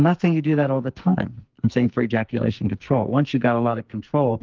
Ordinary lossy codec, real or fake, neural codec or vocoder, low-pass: Opus, 32 kbps; fake; codec, 44.1 kHz, 2.6 kbps, SNAC; 7.2 kHz